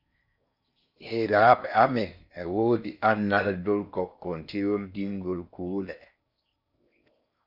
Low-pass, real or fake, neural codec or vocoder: 5.4 kHz; fake; codec, 16 kHz in and 24 kHz out, 0.6 kbps, FocalCodec, streaming, 4096 codes